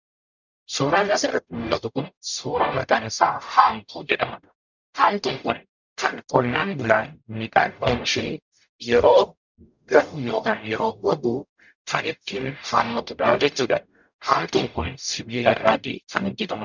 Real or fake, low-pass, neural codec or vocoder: fake; 7.2 kHz; codec, 44.1 kHz, 0.9 kbps, DAC